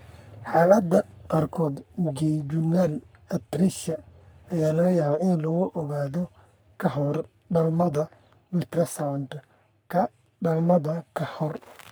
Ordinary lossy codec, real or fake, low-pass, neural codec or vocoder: none; fake; none; codec, 44.1 kHz, 3.4 kbps, Pupu-Codec